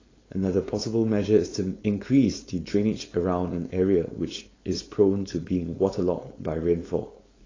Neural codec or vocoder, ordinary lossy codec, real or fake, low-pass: codec, 16 kHz, 4.8 kbps, FACodec; AAC, 32 kbps; fake; 7.2 kHz